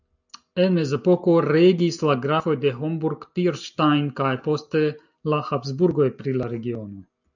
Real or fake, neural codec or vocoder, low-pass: real; none; 7.2 kHz